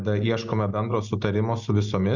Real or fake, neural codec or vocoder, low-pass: real; none; 7.2 kHz